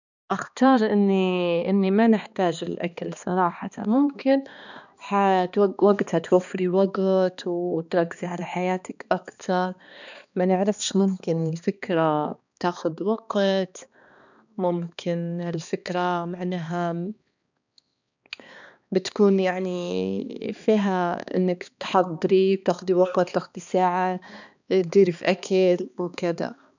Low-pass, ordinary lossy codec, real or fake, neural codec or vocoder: 7.2 kHz; none; fake; codec, 16 kHz, 2 kbps, X-Codec, HuBERT features, trained on balanced general audio